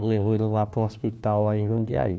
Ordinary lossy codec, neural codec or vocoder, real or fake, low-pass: none; codec, 16 kHz, 1 kbps, FunCodec, trained on LibriTTS, 50 frames a second; fake; none